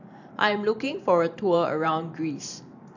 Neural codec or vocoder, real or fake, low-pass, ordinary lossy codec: vocoder, 44.1 kHz, 128 mel bands every 512 samples, BigVGAN v2; fake; 7.2 kHz; none